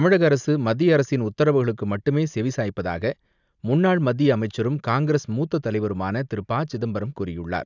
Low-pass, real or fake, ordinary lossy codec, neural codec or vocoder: 7.2 kHz; real; none; none